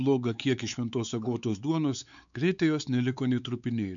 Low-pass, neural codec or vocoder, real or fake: 7.2 kHz; codec, 16 kHz, 4 kbps, X-Codec, WavLM features, trained on Multilingual LibriSpeech; fake